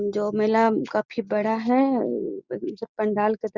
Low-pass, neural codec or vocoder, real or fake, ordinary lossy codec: 7.2 kHz; none; real; Opus, 64 kbps